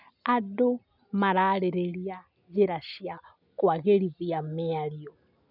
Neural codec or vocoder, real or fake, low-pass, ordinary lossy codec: none; real; 5.4 kHz; none